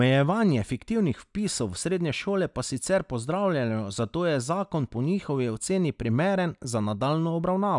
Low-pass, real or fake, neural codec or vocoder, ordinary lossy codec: 10.8 kHz; real; none; none